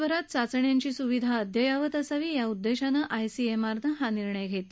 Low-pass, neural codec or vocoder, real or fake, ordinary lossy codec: none; none; real; none